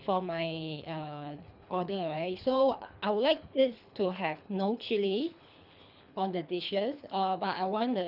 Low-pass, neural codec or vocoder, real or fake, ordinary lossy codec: 5.4 kHz; codec, 24 kHz, 3 kbps, HILCodec; fake; none